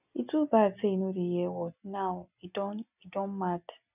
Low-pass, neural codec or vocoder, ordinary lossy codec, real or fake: 3.6 kHz; none; none; real